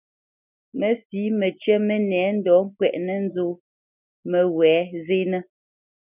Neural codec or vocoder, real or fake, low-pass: none; real; 3.6 kHz